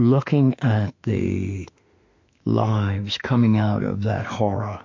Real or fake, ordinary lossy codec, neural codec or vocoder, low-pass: fake; MP3, 48 kbps; autoencoder, 48 kHz, 32 numbers a frame, DAC-VAE, trained on Japanese speech; 7.2 kHz